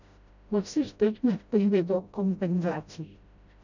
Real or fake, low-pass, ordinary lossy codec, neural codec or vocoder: fake; 7.2 kHz; none; codec, 16 kHz, 0.5 kbps, FreqCodec, smaller model